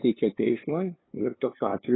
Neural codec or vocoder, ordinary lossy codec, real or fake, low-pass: codec, 16 kHz, 2 kbps, FunCodec, trained on LibriTTS, 25 frames a second; AAC, 16 kbps; fake; 7.2 kHz